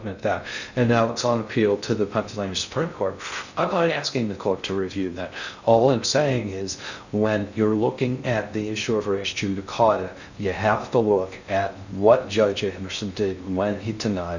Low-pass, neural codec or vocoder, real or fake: 7.2 kHz; codec, 16 kHz in and 24 kHz out, 0.6 kbps, FocalCodec, streaming, 2048 codes; fake